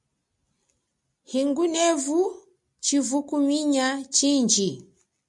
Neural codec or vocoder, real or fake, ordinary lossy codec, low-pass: none; real; MP3, 96 kbps; 10.8 kHz